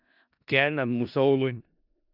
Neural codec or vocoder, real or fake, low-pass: codec, 16 kHz in and 24 kHz out, 0.4 kbps, LongCat-Audio-Codec, four codebook decoder; fake; 5.4 kHz